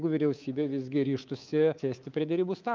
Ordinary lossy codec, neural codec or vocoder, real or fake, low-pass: Opus, 32 kbps; codec, 24 kHz, 3.1 kbps, DualCodec; fake; 7.2 kHz